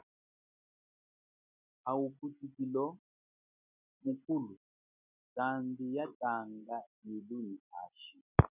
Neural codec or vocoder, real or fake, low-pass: none; real; 3.6 kHz